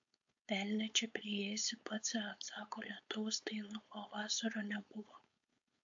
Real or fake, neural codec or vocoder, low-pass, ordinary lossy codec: fake; codec, 16 kHz, 4.8 kbps, FACodec; 7.2 kHz; AAC, 64 kbps